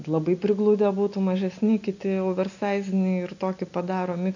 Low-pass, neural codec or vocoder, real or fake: 7.2 kHz; none; real